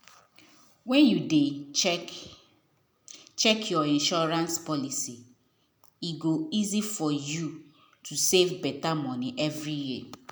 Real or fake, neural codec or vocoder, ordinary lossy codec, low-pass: real; none; none; none